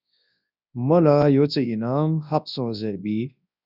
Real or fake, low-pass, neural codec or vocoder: fake; 5.4 kHz; codec, 24 kHz, 0.9 kbps, WavTokenizer, large speech release